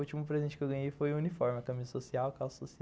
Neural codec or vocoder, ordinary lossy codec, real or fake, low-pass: none; none; real; none